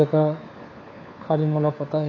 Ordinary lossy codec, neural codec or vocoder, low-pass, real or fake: AAC, 32 kbps; codec, 16 kHz, 4 kbps, FunCodec, trained on LibriTTS, 50 frames a second; 7.2 kHz; fake